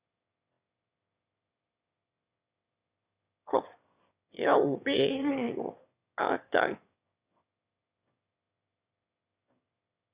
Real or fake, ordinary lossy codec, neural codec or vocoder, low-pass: fake; Opus, 64 kbps; autoencoder, 22.05 kHz, a latent of 192 numbers a frame, VITS, trained on one speaker; 3.6 kHz